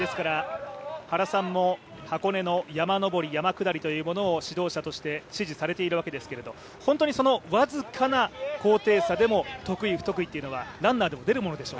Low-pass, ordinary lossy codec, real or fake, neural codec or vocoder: none; none; real; none